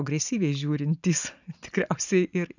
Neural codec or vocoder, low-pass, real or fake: none; 7.2 kHz; real